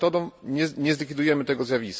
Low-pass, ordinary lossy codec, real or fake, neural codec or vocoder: none; none; real; none